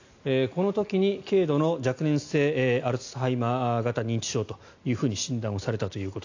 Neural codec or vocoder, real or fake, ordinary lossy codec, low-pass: none; real; AAC, 48 kbps; 7.2 kHz